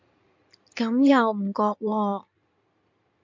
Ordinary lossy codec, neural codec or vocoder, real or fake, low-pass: MP3, 48 kbps; codec, 16 kHz in and 24 kHz out, 2.2 kbps, FireRedTTS-2 codec; fake; 7.2 kHz